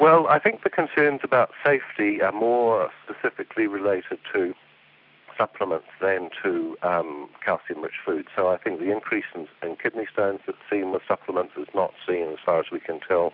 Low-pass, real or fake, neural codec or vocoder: 5.4 kHz; real; none